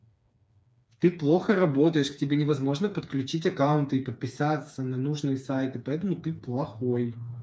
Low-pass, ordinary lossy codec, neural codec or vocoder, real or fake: none; none; codec, 16 kHz, 4 kbps, FreqCodec, smaller model; fake